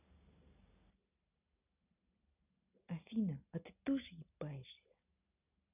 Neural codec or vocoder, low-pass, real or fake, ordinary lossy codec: none; 3.6 kHz; real; none